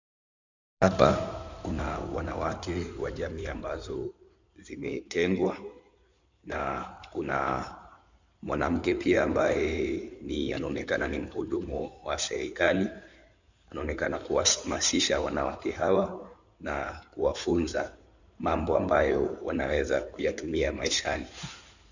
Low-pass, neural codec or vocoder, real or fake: 7.2 kHz; codec, 16 kHz in and 24 kHz out, 2.2 kbps, FireRedTTS-2 codec; fake